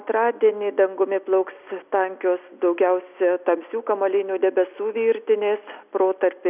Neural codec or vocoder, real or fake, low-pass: none; real; 3.6 kHz